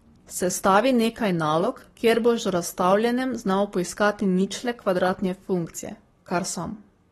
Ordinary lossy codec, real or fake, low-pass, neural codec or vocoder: AAC, 32 kbps; fake; 19.8 kHz; codec, 44.1 kHz, 7.8 kbps, Pupu-Codec